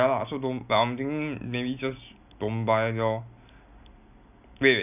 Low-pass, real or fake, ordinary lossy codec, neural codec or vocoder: 3.6 kHz; real; none; none